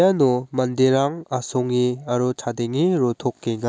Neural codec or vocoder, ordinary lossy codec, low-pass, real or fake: none; none; none; real